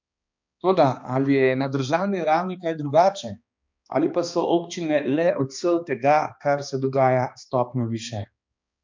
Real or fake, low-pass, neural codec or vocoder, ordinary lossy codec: fake; 7.2 kHz; codec, 16 kHz, 2 kbps, X-Codec, HuBERT features, trained on balanced general audio; MP3, 64 kbps